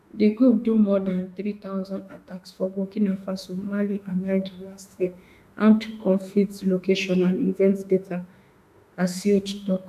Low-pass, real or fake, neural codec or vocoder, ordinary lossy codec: 14.4 kHz; fake; autoencoder, 48 kHz, 32 numbers a frame, DAC-VAE, trained on Japanese speech; none